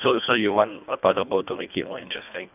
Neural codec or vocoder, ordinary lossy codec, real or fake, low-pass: codec, 24 kHz, 1.5 kbps, HILCodec; none; fake; 3.6 kHz